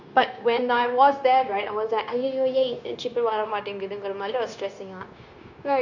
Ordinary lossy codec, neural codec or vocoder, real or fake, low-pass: none; codec, 16 kHz, 0.9 kbps, LongCat-Audio-Codec; fake; 7.2 kHz